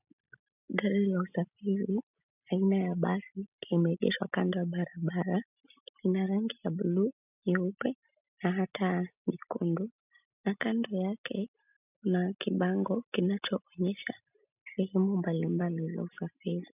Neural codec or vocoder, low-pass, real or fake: none; 3.6 kHz; real